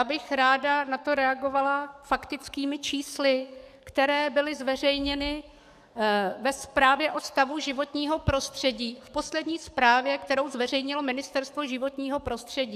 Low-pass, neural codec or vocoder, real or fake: 14.4 kHz; codec, 44.1 kHz, 7.8 kbps, DAC; fake